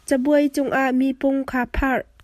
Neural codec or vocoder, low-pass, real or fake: none; 14.4 kHz; real